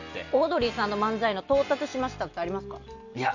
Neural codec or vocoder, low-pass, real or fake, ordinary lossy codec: none; 7.2 kHz; real; none